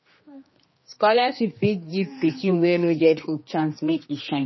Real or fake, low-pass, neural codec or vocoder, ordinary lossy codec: fake; 7.2 kHz; codec, 16 kHz, 2 kbps, X-Codec, HuBERT features, trained on general audio; MP3, 24 kbps